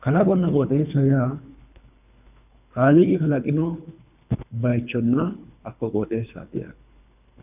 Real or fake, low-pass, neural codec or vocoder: fake; 3.6 kHz; codec, 24 kHz, 3 kbps, HILCodec